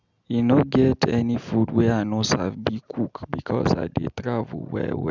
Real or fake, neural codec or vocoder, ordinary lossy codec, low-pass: real; none; none; 7.2 kHz